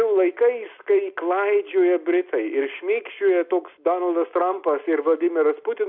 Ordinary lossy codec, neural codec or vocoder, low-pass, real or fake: AAC, 48 kbps; none; 5.4 kHz; real